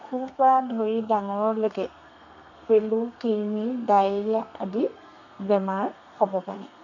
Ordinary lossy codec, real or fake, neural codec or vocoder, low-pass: none; fake; codec, 32 kHz, 1.9 kbps, SNAC; 7.2 kHz